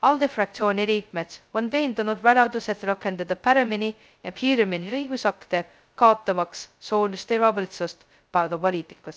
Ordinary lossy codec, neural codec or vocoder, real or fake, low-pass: none; codec, 16 kHz, 0.2 kbps, FocalCodec; fake; none